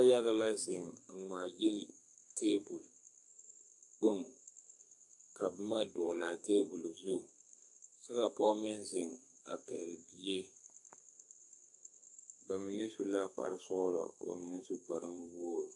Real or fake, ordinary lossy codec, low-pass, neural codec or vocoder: fake; AAC, 64 kbps; 10.8 kHz; codec, 32 kHz, 1.9 kbps, SNAC